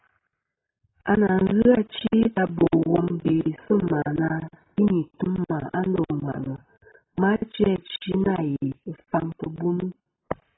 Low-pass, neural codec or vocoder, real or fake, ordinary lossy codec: 7.2 kHz; none; real; AAC, 16 kbps